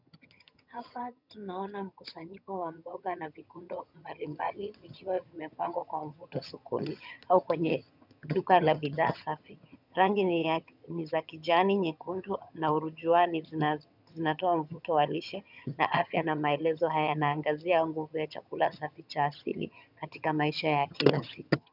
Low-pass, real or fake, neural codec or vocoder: 5.4 kHz; fake; vocoder, 22.05 kHz, 80 mel bands, HiFi-GAN